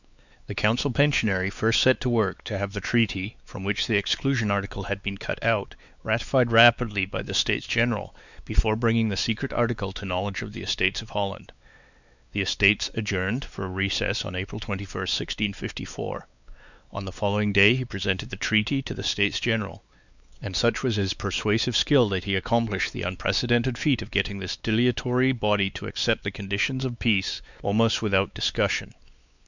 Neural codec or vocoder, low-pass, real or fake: codec, 16 kHz, 4 kbps, X-Codec, WavLM features, trained on Multilingual LibriSpeech; 7.2 kHz; fake